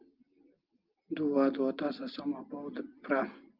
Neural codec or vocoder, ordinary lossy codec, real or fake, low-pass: none; Opus, 24 kbps; real; 5.4 kHz